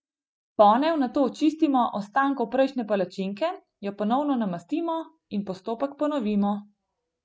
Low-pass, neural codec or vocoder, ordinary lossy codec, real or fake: none; none; none; real